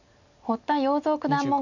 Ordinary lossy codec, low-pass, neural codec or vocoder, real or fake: none; 7.2 kHz; none; real